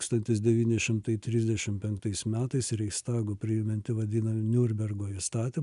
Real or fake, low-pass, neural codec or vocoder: real; 10.8 kHz; none